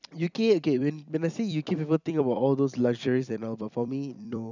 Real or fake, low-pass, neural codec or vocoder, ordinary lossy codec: fake; 7.2 kHz; vocoder, 44.1 kHz, 128 mel bands every 256 samples, BigVGAN v2; none